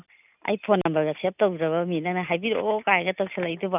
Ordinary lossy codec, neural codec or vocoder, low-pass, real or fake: none; none; 3.6 kHz; real